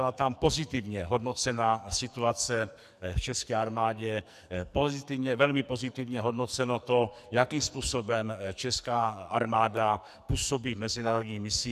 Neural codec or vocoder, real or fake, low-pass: codec, 44.1 kHz, 2.6 kbps, SNAC; fake; 14.4 kHz